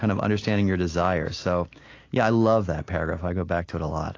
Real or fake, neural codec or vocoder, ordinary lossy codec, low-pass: real; none; AAC, 32 kbps; 7.2 kHz